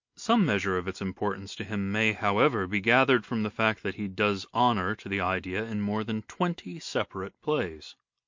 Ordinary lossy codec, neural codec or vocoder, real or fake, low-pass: MP3, 48 kbps; none; real; 7.2 kHz